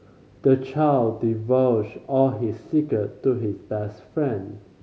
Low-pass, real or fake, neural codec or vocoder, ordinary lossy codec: none; real; none; none